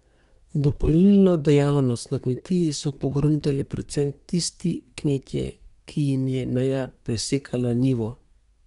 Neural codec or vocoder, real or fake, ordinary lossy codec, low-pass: codec, 24 kHz, 1 kbps, SNAC; fake; none; 10.8 kHz